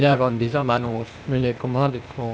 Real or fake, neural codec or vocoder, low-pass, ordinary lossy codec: fake; codec, 16 kHz, 0.8 kbps, ZipCodec; none; none